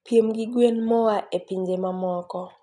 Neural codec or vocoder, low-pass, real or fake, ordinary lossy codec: none; 10.8 kHz; real; none